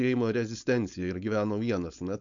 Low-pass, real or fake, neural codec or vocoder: 7.2 kHz; fake; codec, 16 kHz, 4.8 kbps, FACodec